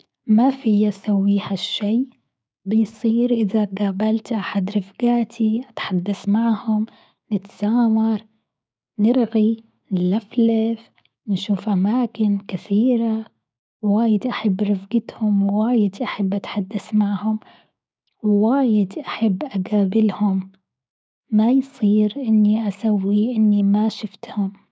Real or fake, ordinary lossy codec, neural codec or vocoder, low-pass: fake; none; codec, 16 kHz, 6 kbps, DAC; none